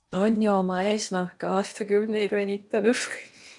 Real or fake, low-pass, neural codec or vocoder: fake; 10.8 kHz; codec, 16 kHz in and 24 kHz out, 0.8 kbps, FocalCodec, streaming, 65536 codes